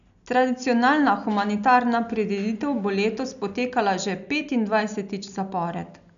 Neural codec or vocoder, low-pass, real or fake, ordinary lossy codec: none; 7.2 kHz; real; none